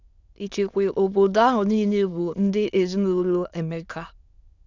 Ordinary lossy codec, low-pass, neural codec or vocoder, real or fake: Opus, 64 kbps; 7.2 kHz; autoencoder, 22.05 kHz, a latent of 192 numbers a frame, VITS, trained on many speakers; fake